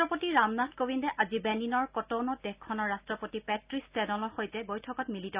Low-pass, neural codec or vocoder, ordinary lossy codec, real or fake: 3.6 kHz; none; Opus, 64 kbps; real